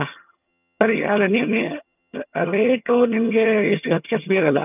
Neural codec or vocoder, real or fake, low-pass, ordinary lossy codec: vocoder, 22.05 kHz, 80 mel bands, HiFi-GAN; fake; 3.6 kHz; none